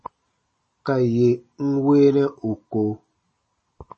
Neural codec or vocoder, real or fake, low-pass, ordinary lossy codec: none; real; 10.8 kHz; MP3, 32 kbps